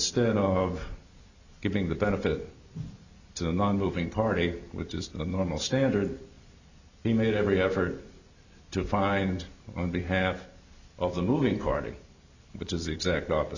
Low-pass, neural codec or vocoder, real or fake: 7.2 kHz; none; real